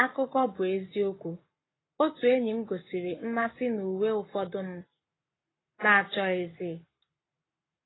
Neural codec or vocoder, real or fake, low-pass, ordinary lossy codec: codec, 44.1 kHz, 7.8 kbps, Pupu-Codec; fake; 7.2 kHz; AAC, 16 kbps